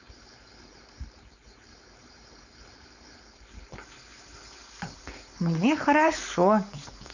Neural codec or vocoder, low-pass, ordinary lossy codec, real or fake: codec, 16 kHz, 4.8 kbps, FACodec; 7.2 kHz; none; fake